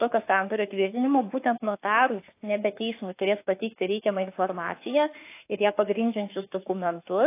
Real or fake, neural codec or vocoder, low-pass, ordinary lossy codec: fake; autoencoder, 48 kHz, 32 numbers a frame, DAC-VAE, trained on Japanese speech; 3.6 kHz; AAC, 24 kbps